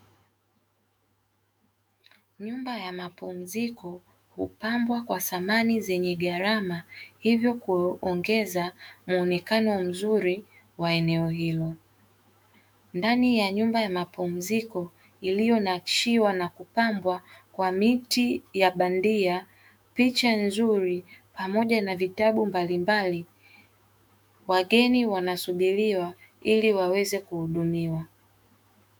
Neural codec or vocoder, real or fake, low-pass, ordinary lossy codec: autoencoder, 48 kHz, 128 numbers a frame, DAC-VAE, trained on Japanese speech; fake; 19.8 kHz; MP3, 96 kbps